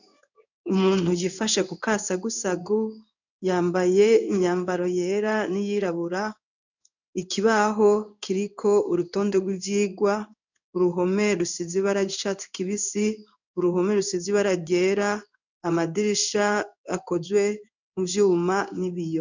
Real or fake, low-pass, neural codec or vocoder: fake; 7.2 kHz; codec, 16 kHz in and 24 kHz out, 1 kbps, XY-Tokenizer